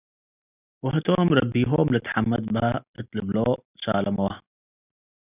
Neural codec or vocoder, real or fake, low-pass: none; real; 3.6 kHz